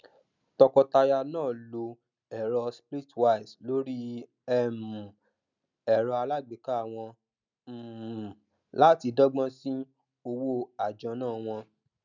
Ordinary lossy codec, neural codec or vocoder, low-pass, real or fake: none; none; 7.2 kHz; real